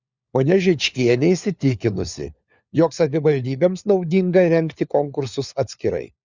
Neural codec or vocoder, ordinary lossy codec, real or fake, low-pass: codec, 16 kHz, 4 kbps, FunCodec, trained on LibriTTS, 50 frames a second; Opus, 64 kbps; fake; 7.2 kHz